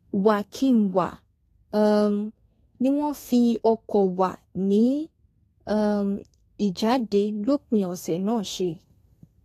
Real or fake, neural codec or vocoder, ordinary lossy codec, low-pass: fake; codec, 32 kHz, 1.9 kbps, SNAC; AAC, 48 kbps; 14.4 kHz